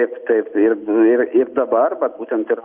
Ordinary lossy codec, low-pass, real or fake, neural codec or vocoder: Opus, 24 kbps; 3.6 kHz; real; none